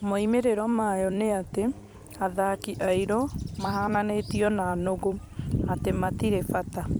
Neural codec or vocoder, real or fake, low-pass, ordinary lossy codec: vocoder, 44.1 kHz, 128 mel bands every 256 samples, BigVGAN v2; fake; none; none